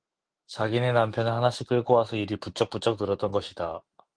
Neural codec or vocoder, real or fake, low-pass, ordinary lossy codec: autoencoder, 48 kHz, 128 numbers a frame, DAC-VAE, trained on Japanese speech; fake; 9.9 kHz; Opus, 24 kbps